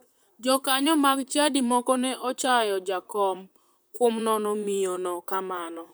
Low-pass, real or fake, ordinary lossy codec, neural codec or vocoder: none; fake; none; vocoder, 44.1 kHz, 128 mel bands, Pupu-Vocoder